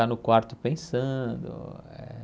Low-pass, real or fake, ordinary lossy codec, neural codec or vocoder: none; real; none; none